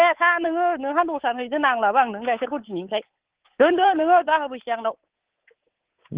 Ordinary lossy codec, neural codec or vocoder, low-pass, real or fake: Opus, 16 kbps; codec, 24 kHz, 3.1 kbps, DualCodec; 3.6 kHz; fake